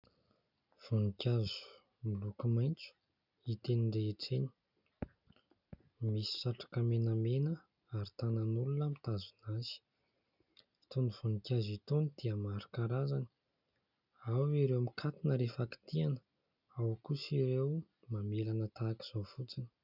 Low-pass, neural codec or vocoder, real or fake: 5.4 kHz; none; real